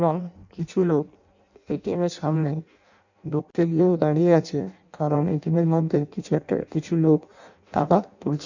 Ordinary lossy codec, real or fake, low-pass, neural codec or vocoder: none; fake; 7.2 kHz; codec, 16 kHz in and 24 kHz out, 0.6 kbps, FireRedTTS-2 codec